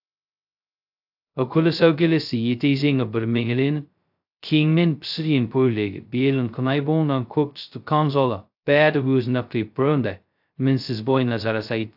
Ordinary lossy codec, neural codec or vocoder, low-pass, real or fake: AAC, 48 kbps; codec, 16 kHz, 0.2 kbps, FocalCodec; 5.4 kHz; fake